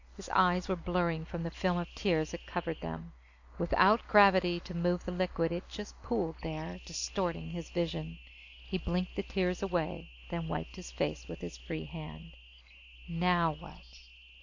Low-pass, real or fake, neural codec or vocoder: 7.2 kHz; real; none